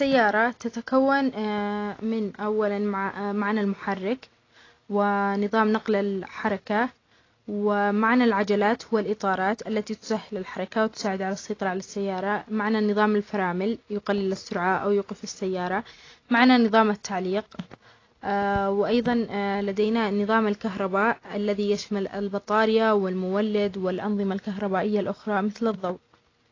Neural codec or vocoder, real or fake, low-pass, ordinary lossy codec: none; real; 7.2 kHz; AAC, 32 kbps